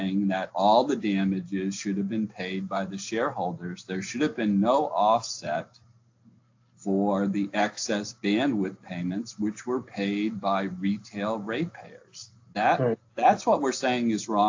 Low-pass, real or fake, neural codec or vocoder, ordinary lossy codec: 7.2 kHz; real; none; AAC, 48 kbps